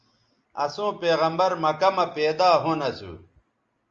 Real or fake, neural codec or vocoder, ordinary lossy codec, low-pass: real; none; Opus, 32 kbps; 7.2 kHz